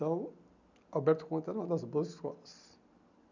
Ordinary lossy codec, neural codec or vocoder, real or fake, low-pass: none; none; real; 7.2 kHz